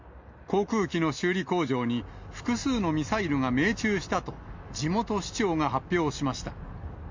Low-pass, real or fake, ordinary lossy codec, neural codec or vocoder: 7.2 kHz; real; MP3, 48 kbps; none